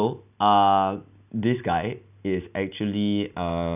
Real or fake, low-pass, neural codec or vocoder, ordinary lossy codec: real; 3.6 kHz; none; none